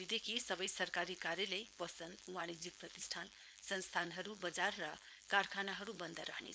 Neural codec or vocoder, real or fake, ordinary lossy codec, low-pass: codec, 16 kHz, 4.8 kbps, FACodec; fake; none; none